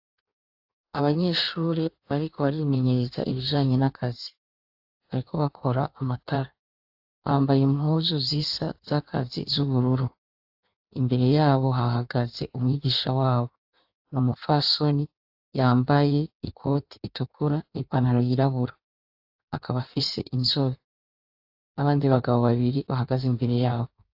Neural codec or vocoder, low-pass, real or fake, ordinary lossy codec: codec, 16 kHz in and 24 kHz out, 1.1 kbps, FireRedTTS-2 codec; 5.4 kHz; fake; AAC, 48 kbps